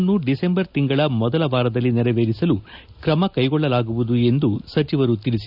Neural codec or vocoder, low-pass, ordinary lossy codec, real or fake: none; 5.4 kHz; none; real